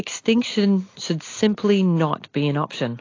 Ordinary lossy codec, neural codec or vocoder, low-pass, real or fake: AAC, 32 kbps; none; 7.2 kHz; real